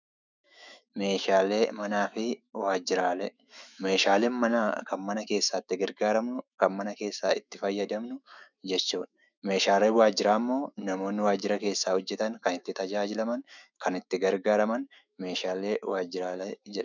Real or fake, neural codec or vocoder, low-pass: fake; autoencoder, 48 kHz, 128 numbers a frame, DAC-VAE, trained on Japanese speech; 7.2 kHz